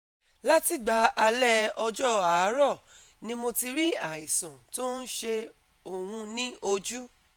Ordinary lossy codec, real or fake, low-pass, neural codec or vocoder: none; fake; none; vocoder, 48 kHz, 128 mel bands, Vocos